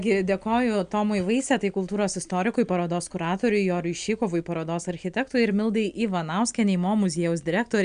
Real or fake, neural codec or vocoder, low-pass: real; none; 9.9 kHz